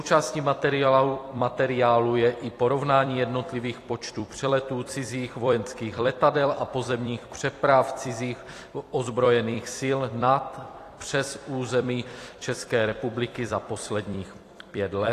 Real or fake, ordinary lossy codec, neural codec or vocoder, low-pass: fake; AAC, 48 kbps; vocoder, 44.1 kHz, 128 mel bands every 256 samples, BigVGAN v2; 14.4 kHz